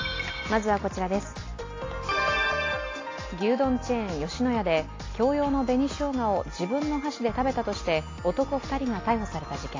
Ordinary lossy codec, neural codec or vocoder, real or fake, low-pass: AAC, 32 kbps; none; real; 7.2 kHz